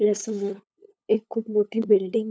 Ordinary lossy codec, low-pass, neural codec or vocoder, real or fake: none; none; codec, 16 kHz, 8 kbps, FunCodec, trained on LibriTTS, 25 frames a second; fake